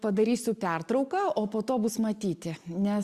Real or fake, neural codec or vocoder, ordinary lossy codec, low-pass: real; none; Opus, 64 kbps; 14.4 kHz